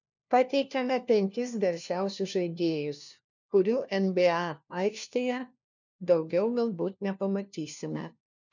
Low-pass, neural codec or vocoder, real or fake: 7.2 kHz; codec, 16 kHz, 1 kbps, FunCodec, trained on LibriTTS, 50 frames a second; fake